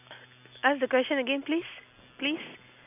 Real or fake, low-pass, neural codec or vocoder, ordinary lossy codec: real; 3.6 kHz; none; none